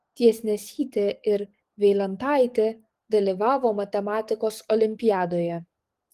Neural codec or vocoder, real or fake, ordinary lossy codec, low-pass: codec, 44.1 kHz, 7.8 kbps, DAC; fake; Opus, 24 kbps; 14.4 kHz